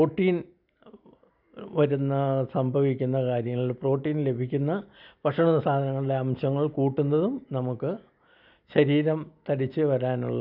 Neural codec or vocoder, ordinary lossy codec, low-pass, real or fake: none; none; 5.4 kHz; real